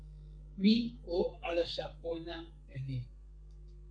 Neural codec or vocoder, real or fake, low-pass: codec, 32 kHz, 1.9 kbps, SNAC; fake; 9.9 kHz